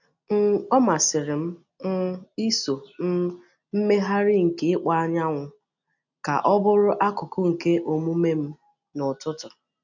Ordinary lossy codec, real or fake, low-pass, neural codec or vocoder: none; real; 7.2 kHz; none